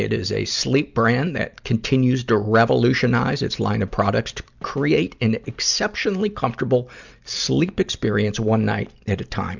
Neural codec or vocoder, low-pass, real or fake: none; 7.2 kHz; real